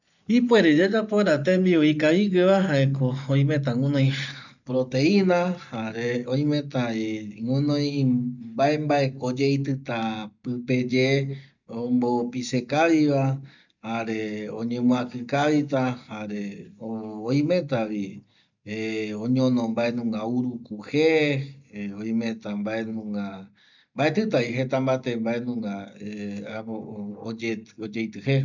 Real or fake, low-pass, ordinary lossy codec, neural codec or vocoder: real; 7.2 kHz; none; none